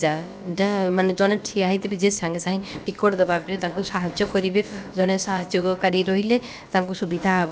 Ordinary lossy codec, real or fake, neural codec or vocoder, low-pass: none; fake; codec, 16 kHz, about 1 kbps, DyCAST, with the encoder's durations; none